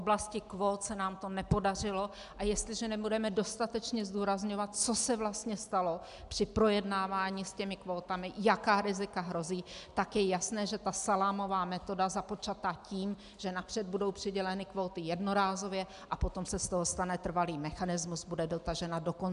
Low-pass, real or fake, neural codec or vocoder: 10.8 kHz; real; none